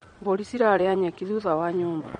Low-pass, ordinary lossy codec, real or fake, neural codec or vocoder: 9.9 kHz; MP3, 48 kbps; fake; vocoder, 22.05 kHz, 80 mel bands, Vocos